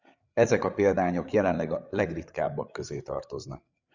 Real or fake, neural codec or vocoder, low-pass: fake; codec, 16 kHz, 8 kbps, FreqCodec, larger model; 7.2 kHz